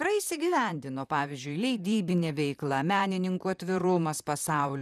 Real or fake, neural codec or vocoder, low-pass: fake; vocoder, 44.1 kHz, 128 mel bands, Pupu-Vocoder; 14.4 kHz